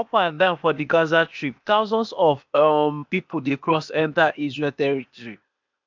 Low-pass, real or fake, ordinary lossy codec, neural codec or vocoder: 7.2 kHz; fake; MP3, 64 kbps; codec, 16 kHz, 0.8 kbps, ZipCodec